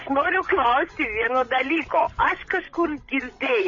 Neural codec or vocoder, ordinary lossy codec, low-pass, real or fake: codec, 16 kHz, 16 kbps, FreqCodec, larger model; MP3, 32 kbps; 7.2 kHz; fake